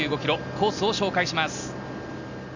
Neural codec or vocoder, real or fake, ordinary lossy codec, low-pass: none; real; none; 7.2 kHz